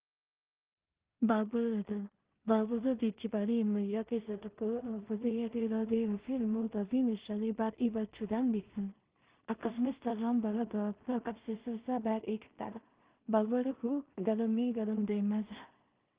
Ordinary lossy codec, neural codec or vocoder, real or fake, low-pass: Opus, 32 kbps; codec, 16 kHz in and 24 kHz out, 0.4 kbps, LongCat-Audio-Codec, two codebook decoder; fake; 3.6 kHz